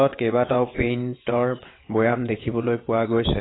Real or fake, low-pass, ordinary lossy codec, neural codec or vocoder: fake; 7.2 kHz; AAC, 16 kbps; vocoder, 22.05 kHz, 80 mel bands, WaveNeXt